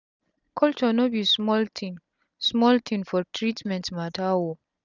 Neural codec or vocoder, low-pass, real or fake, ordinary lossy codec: none; 7.2 kHz; real; none